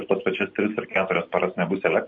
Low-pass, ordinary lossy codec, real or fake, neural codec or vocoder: 7.2 kHz; MP3, 32 kbps; real; none